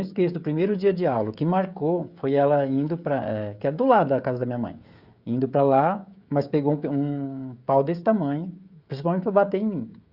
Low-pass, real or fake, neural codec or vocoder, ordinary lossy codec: 5.4 kHz; fake; codec, 16 kHz, 16 kbps, FreqCodec, smaller model; Opus, 64 kbps